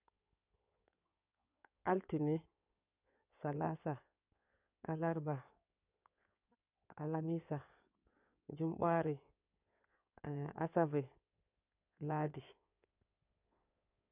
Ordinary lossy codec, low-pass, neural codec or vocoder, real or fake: none; 3.6 kHz; codec, 16 kHz in and 24 kHz out, 2.2 kbps, FireRedTTS-2 codec; fake